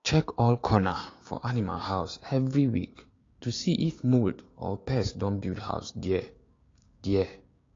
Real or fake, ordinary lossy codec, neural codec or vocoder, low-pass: fake; AAC, 32 kbps; codec, 16 kHz, 6 kbps, DAC; 7.2 kHz